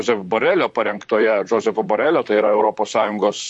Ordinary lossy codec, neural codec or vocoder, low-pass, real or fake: MP3, 48 kbps; vocoder, 44.1 kHz, 128 mel bands, Pupu-Vocoder; 10.8 kHz; fake